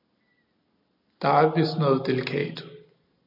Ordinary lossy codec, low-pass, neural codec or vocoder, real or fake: AAC, 48 kbps; 5.4 kHz; none; real